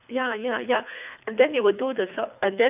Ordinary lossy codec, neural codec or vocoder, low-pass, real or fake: none; codec, 24 kHz, 3 kbps, HILCodec; 3.6 kHz; fake